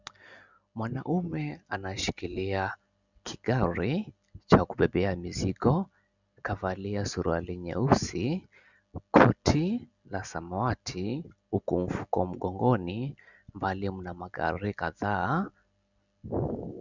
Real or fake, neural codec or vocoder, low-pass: real; none; 7.2 kHz